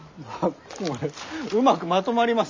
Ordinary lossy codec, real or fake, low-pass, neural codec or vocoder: MP3, 64 kbps; real; 7.2 kHz; none